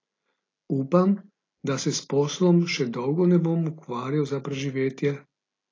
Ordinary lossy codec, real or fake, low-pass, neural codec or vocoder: AAC, 32 kbps; real; 7.2 kHz; none